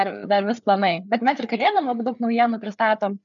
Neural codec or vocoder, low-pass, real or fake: codec, 16 kHz, 4 kbps, FreqCodec, larger model; 7.2 kHz; fake